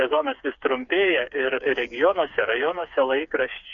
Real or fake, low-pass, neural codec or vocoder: fake; 7.2 kHz; codec, 16 kHz, 4 kbps, FreqCodec, smaller model